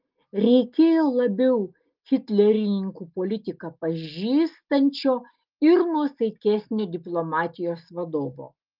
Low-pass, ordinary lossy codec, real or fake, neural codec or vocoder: 5.4 kHz; Opus, 32 kbps; real; none